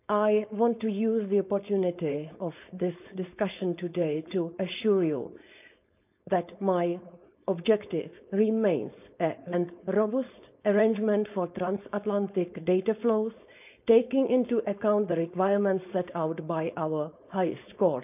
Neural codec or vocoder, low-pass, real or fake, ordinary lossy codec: codec, 16 kHz, 4.8 kbps, FACodec; 3.6 kHz; fake; none